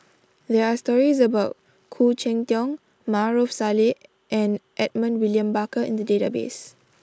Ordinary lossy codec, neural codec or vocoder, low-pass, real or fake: none; none; none; real